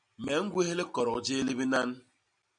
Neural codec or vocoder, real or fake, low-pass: none; real; 9.9 kHz